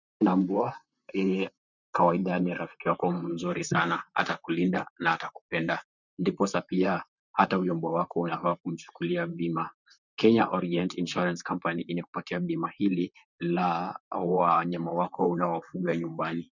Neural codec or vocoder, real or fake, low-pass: vocoder, 44.1 kHz, 128 mel bands, Pupu-Vocoder; fake; 7.2 kHz